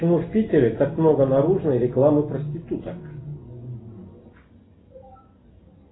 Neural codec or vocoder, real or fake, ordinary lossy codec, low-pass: none; real; AAC, 16 kbps; 7.2 kHz